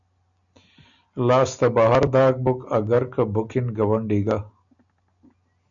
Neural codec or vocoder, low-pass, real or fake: none; 7.2 kHz; real